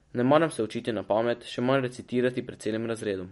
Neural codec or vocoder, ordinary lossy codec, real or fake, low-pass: none; MP3, 48 kbps; real; 19.8 kHz